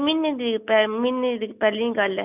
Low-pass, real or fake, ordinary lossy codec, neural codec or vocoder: 3.6 kHz; real; none; none